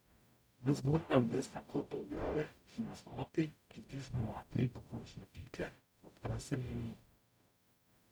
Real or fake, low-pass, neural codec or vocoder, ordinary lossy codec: fake; none; codec, 44.1 kHz, 0.9 kbps, DAC; none